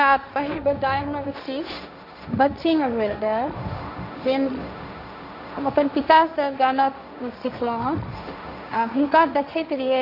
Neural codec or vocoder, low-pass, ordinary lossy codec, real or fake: codec, 16 kHz, 1.1 kbps, Voila-Tokenizer; 5.4 kHz; none; fake